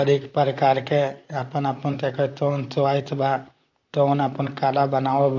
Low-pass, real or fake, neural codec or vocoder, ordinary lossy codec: 7.2 kHz; fake; vocoder, 44.1 kHz, 128 mel bands, Pupu-Vocoder; AAC, 48 kbps